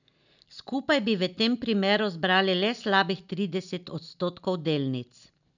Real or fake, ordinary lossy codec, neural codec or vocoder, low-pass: real; none; none; 7.2 kHz